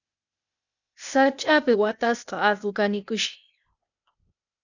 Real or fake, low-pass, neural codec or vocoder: fake; 7.2 kHz; codec, 16 kHz, 0.8 kbps, ZipCodec